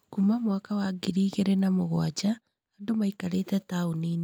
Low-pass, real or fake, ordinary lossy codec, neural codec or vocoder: none; real; none; none